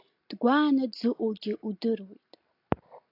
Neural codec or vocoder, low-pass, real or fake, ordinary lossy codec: none; 5.4 kHz; real; AAC, 32 kbps